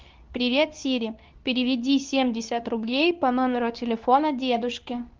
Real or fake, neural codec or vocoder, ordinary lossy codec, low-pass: fake; codec, 24 kHz, 0.9 kbps, WavTokenizer, medium speech release version 2; Opus, 32 kbps; 7.2 kHz